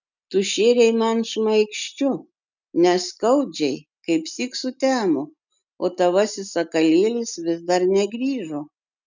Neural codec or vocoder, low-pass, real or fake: none; 7.2 kHz; real